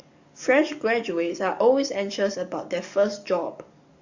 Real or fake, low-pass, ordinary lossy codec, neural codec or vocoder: fake; 7.2 kHz; Opus, 64 kbps; codec, 44.1 kHz, 7.8 kbps, DAC